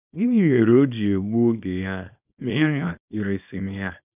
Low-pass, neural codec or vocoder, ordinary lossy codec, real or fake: 3.6 kHz; codec, 24 kHz, 0.9 kbps, WavTokenizer, small release; none; fake